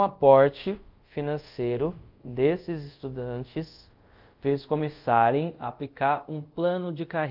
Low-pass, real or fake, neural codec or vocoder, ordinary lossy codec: 5.4 kHz; fake; codec, 24 kHz, 0.5 kbps, DualCodec; Opus, 32 kbps